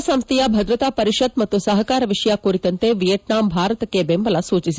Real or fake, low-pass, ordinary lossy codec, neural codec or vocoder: real; none; none; none